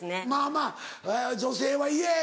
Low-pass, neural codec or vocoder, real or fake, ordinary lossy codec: none; none; real; none